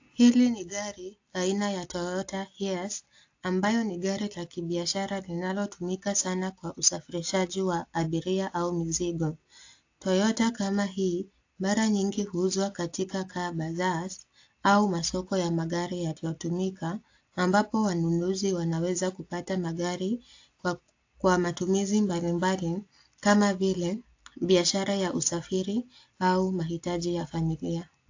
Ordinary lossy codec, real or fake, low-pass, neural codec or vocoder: AAC, 48 kbps; real; 7.2 kHz; none